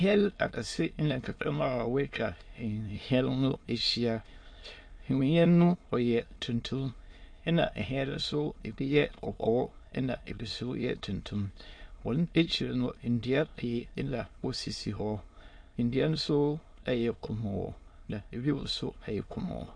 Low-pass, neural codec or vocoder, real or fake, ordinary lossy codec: 9.9 kHz; autoencoder, 22.05 kHz, a latent of 192 numbers a frame, VITS, trained on many speakers; fake; MP3, 48 kbps